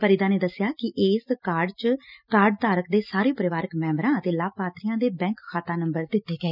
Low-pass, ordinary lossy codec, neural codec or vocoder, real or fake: 5.4 kHz; none; none; real